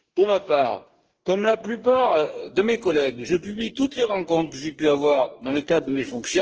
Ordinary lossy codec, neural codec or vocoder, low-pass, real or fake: Opus, 16 kbps; codec, 44.1 kHz, 2.6 kbps, DAC; 7.2 kHz; fake